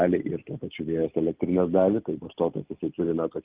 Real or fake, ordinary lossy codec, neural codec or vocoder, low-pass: real; Opus, 24 kbps; none; 3.6 kHz